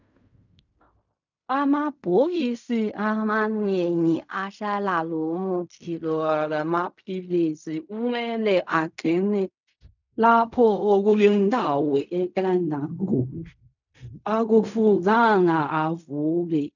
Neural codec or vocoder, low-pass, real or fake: codec, 16 kHz in and 24 kHz out, 0.4 kbps, LongCat-Audio-Codec, fine tuned four codebook decoder; 7.2 kHz; fake